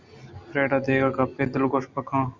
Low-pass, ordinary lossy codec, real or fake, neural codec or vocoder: 7.2 kHz; Opus, 64 kbps; real; none